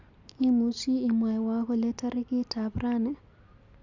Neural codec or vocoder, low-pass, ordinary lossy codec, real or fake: none; 7.2 kHz; Opus, 64 kbps; real